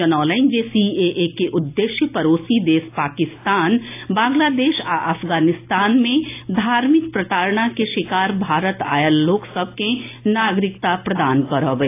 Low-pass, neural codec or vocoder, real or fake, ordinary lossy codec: 3.6 kHz; none; real; AAC, 24 kbps